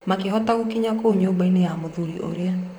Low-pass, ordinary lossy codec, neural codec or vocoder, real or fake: 19.8 kHz; none; vocoder, 44.1 kHz, 128 mel bands, Pupu-Vocoder; fake